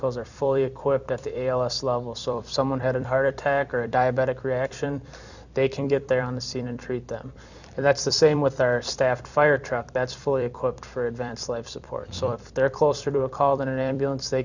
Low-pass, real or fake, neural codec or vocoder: 7.2 kHz; fake; vocoder, 44.1 kHz, 128 mel bands every 256 samples, BigVGAN v2